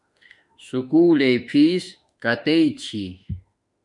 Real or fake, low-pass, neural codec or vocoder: fake; 10.8 kHz; autoencoder, 48 kHz, 32 numbers a frame, DAC-VAE, trained on Japanese speech